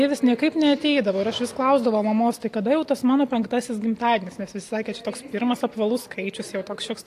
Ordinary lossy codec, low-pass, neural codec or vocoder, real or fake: AAC, 64 kbps; 14.4 kHz; none; real